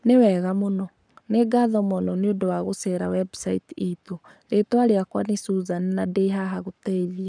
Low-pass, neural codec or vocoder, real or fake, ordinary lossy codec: 9.9 kHz; codec, 44.1 kHz, 7.8 kbps, Pupu-Codec; fake; none